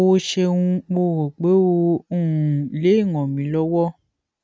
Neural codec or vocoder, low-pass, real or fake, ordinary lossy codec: none; none; real; none